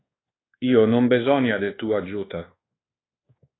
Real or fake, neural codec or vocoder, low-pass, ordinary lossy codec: fake; codec, 24 kHz, 1.2 kbps, DualCodec; 7.2 kHz; AAC, 16 kbps